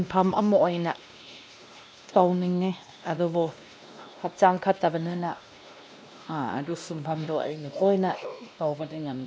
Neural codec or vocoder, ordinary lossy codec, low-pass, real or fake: codec, 16 kHz, 1 kbps, X-Codec, WavLM features, trained on Multilingual LibriSpeech; none; none; fake